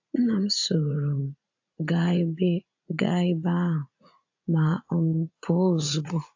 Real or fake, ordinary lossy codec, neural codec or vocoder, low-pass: fake; AAC, 48 kbps; vocoder, 44.1 kHz, 80 mel bands, Vocos; 7.2 kHz